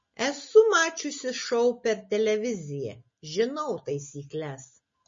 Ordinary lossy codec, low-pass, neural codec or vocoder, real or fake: MP3, 32 kbps; 7.2 kHz; none; real